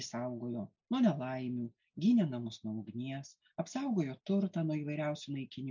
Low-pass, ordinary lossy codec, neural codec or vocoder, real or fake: 7.2 kHz; MP3, 64 kbps; none; real